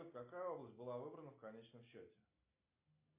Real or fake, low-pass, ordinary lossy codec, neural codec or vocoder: real; 3.6 kHz; MP3, 24 kbps; none